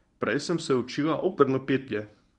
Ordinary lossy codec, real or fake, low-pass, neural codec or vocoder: none; fake; 10.8 kHz; codec, 24 kHz, 0.9 kbps, WavTokenizer, medium speech release version 1